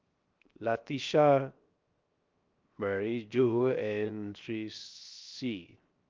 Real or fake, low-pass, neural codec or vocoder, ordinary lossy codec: fake; 7.2 kHz; codec, 16 kHz, 0.7 kbps, FocalCodec; Opus, 24 kbps